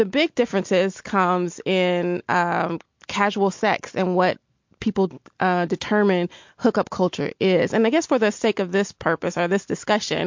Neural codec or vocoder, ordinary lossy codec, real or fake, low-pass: none; MP3, 48 kbps; real; 7.2 kHz